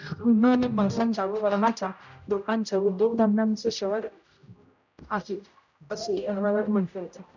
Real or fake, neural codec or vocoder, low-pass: fake; codec, 16 kHz, 0.5 kbps, X-Codec, HuBERT features, trained on general audio; 7.2 kHz